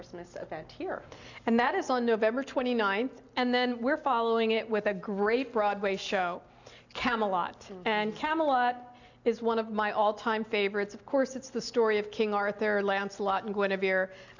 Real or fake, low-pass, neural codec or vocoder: real; 7.2 kHz; none